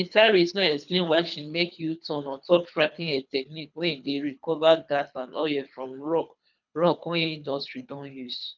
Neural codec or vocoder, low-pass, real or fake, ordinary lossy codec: codec, 24 kHz, 3 kbps, HILCodec; 7.2 kHz; fake; none